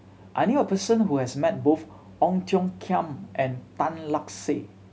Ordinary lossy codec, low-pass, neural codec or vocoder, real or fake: none; none; none; real